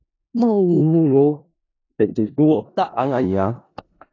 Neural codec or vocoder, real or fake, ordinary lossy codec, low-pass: codec, 16 kHz in and 24 kHz out, 0.4 kbps, LongCat-Audio-Codec, four codebook decoder; fake; AAC, 32 kbps; 7.2 kHz